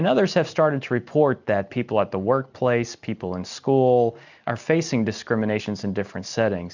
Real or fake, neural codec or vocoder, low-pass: real; none; 7.2 kHz